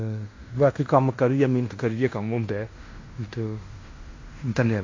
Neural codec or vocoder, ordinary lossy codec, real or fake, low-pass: codec, 16 kHz in and 24 kHz out, 0.9 kbps, LongCat-Audio-Codec, fine tuned four codebook decoder; AAC, 32 kbps; fake; 7.2 kHz